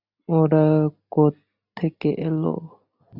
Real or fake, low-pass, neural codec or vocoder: real; 5.4 kHz; none